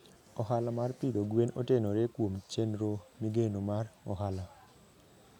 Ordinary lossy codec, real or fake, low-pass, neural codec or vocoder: none; real; 19.8 kHz; none